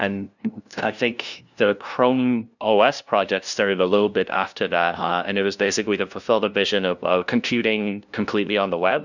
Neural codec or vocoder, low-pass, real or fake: codec, 16 kHz, 0.5 kbps, FunCodec, trained on LibriTTS, 25 frames a second; 7.2 kHz; fake